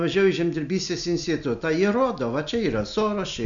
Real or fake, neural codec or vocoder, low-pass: real; none; 7.2 kHz